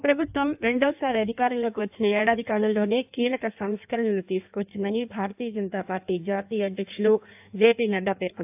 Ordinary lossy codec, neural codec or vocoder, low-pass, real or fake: AAC, 32 kbps; codec, 16 kHz in and 24 kHz out, 1.1 kbps, FireRedTTS-2 codec; 3.6 kHz; fake